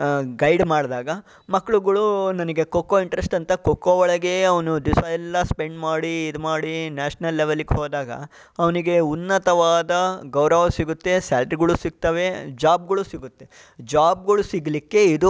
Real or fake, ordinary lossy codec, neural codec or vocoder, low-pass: real; none; none; none